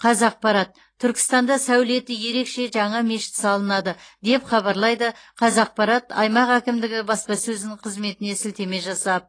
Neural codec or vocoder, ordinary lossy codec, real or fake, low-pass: none; AAC, 32 kbps; real; 9.9 kHz